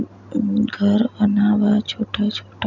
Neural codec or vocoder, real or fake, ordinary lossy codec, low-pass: none; real; none; 7.2 kHz